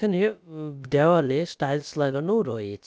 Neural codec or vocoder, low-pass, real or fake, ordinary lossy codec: codec, 16 kHz, about 1 kbps, DyCAST, with the encoder's durations; none; fake; none